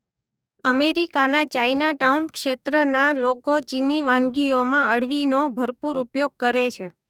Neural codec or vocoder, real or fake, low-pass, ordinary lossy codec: codec, 44.1 kHz, 2.6 kbps, DAC; fake; 19.8 kHz; none